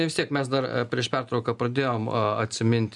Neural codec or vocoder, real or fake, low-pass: none; real; 10.8 kHz